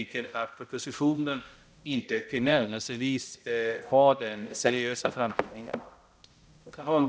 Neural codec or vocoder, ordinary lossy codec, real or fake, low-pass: codec, 16 kHz, 0.5 kbps, X-Codec, HuBERT features, trained on balanced general audio; none; fake; none